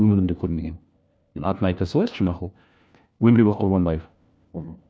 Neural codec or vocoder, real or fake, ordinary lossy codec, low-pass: codec, 16 kHz, 1 kbps, FunCodec, trained on LibriTTS, 50 frames a second; fake; none; none